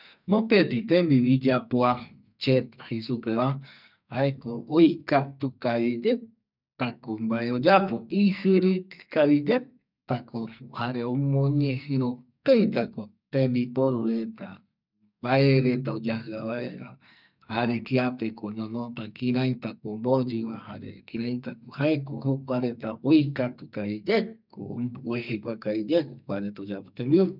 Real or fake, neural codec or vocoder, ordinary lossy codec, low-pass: fake; codec, 44.1 kHz, 2.6 kbps, SNAC; none; 5.4 kHz